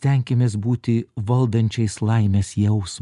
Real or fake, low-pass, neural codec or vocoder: real; 10.8 kHz; none